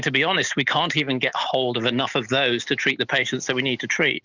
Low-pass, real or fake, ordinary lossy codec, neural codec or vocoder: 7.2 kHz; real; Opus, 64 kbps; none